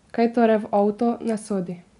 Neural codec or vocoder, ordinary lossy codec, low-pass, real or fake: none; none; 10.8 kHz; real